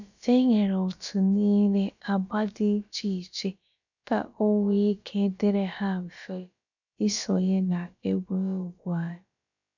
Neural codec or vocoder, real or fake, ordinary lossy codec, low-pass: codec, 16 kHz, about 1 kbps, DyCAST, with the encoder's durations; fake; none; 7.2 kHz